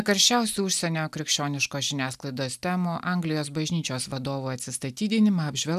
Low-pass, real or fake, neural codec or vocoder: 14.4 kHz; real; none